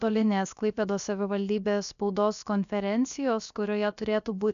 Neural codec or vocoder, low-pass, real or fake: codec, 16 kHz, about 1 kbps, DyCAST, with the encoder's durations; 7.2 kHz; fake